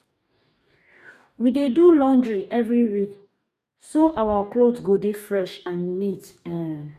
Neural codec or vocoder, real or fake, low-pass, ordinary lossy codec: codec, 44.1 kHz, 2.6 kbps, DAC; fake; 14.4 kHz; none